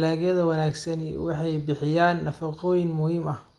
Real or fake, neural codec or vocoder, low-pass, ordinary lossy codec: real; none; 10.8 kHz; Opus, 24 kbps